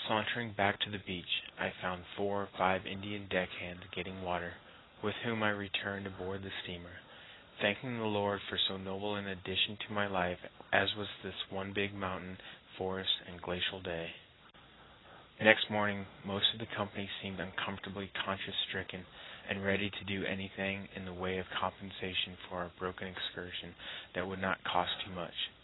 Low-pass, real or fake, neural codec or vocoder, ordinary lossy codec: 7.2 kHz; real; none; AAC, 16 kbps